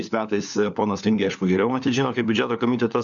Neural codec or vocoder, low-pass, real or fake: codec, 16 kHz, 4 kbps, FunCodec, trained on LibriTTS, 50 frames a second; 7.2 kHz; fake